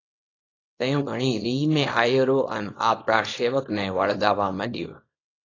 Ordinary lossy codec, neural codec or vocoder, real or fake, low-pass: AAC, 32 kbps; codec, 24 kHz, 0.9 kbps, WavTokenizer, small release; fake; 7.2 kHz